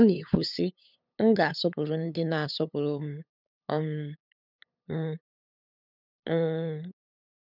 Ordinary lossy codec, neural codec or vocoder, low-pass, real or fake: none; codec, 16 kHz, 8 kbps, FunCodec, trained on LibriTTS, 25 frames a second; 5.4 kHz; fake